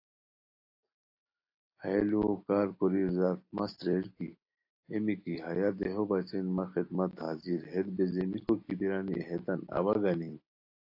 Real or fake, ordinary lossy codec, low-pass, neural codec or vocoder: real; AAC, 32 kbps; 5.4 kHz; none